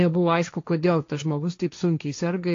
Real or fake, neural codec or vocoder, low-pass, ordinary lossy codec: fake; codec, 16 kHz, 1.1 kbps, Voila-Tokenizer; 7.2 kHz; AAC, 48 kbps